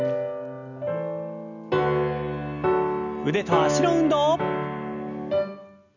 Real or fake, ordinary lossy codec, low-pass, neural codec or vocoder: real; none; 7.2 kHz; none